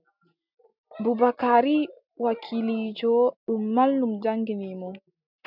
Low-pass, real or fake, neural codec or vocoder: 5.4 kHz; real; none